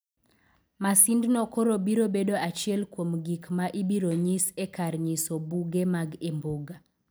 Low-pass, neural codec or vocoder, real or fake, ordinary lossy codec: none; none; real; none